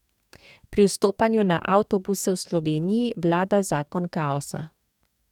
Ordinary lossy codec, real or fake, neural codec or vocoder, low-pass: none; fake; codec, 44.1 kHz, 2.6 kbps, DAC; 19.8 kHz